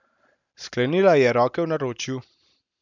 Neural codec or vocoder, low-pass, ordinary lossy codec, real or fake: none; 7.2 kHz; none; real